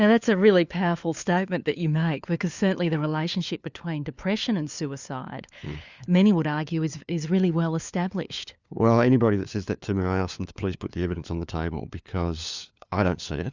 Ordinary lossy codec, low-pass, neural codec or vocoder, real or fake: Opus, 64 kbps; 7.2 kHz; codec, 16 kHz, 4 kbps, FunCodec, trained on LibriTTS, 50 frames a second; fake